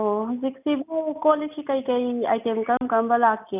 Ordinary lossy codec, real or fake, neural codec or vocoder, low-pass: none; real; none; 3.6 kHz